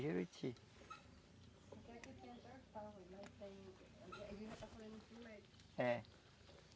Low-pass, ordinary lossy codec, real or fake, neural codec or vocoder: none; none; real; none